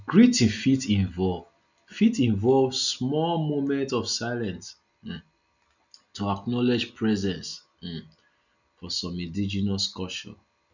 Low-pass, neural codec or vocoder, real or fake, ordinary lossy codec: 7.2 kHz; none; real; none